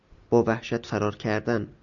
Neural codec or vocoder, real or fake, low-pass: none; real; 7.2 kHz